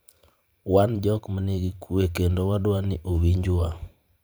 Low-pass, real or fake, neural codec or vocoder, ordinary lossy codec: none; fake; vocoder, 44.1 kHz, 128 mel bands every 512 samples, BigVGAN v2; none